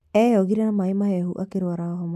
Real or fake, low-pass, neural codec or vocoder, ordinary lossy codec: real; 14.4 kHz; none; AAC, 96 kbps